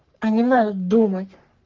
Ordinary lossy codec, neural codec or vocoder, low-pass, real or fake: Opus, 16 kbps; codec, 44.1 kHz, 3.4 kbps, Pupu-Codec; 7.2 kHz; fake